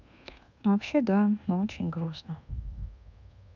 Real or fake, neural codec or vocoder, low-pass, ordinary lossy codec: fake; codec, 24 kHz, 1.2 kbps, DualCodec; 7.2 kHz; none